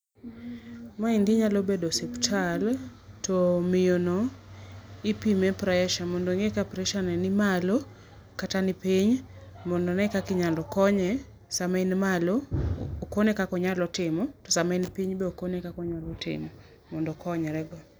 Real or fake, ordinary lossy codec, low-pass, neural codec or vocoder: real; none; none; none